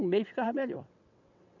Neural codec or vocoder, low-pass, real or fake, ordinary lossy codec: vocoder, 22.05 kHz, 80 mel bands, WaveNeXt; 7.2 kHz; fake; none